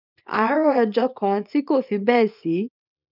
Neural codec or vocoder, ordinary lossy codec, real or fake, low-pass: codec, 24 kHz, 0.9 kbps, WavTokenizer, small release; none; fake; 5.4 kHz